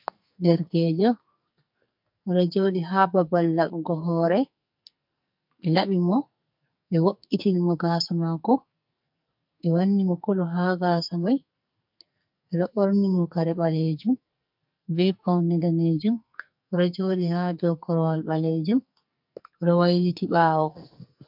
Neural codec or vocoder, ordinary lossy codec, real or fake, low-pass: codec, 44.1 kHz, 2.6 kbps, SNAC; MP3, 48 kbps; fake; 5.4 kHz